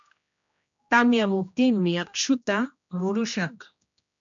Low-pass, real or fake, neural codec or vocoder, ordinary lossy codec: 7.2 kHz; fake; codec, 16 kHz, 1 kbps, X-Codec, HuBERT features, trained on general audio; MP3, 64 kbps